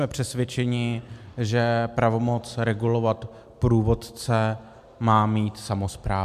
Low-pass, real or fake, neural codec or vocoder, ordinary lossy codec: 14.4 kHz; real; none; MP3, 96 kbps